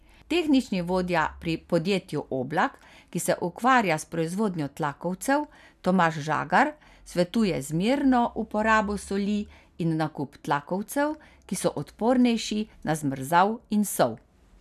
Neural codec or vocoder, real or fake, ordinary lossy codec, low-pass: none; real; none; 14.4 kHz